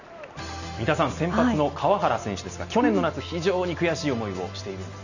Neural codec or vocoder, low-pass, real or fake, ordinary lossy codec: none; 7.2 kHz; real; none